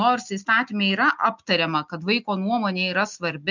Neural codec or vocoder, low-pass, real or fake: none; 7.2 kHz; real